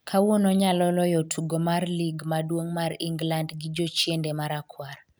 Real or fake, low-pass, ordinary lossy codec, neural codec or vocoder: real; none; none; none